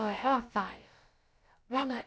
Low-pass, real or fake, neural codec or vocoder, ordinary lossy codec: none; fake; codec, 16 kHz, about 1 kbps, DyCAST, with the encoder's durations; none